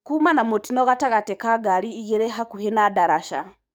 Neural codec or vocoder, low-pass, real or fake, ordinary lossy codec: codec, 44.1 kHz, 7.8 kbps, DAC; none; fake; none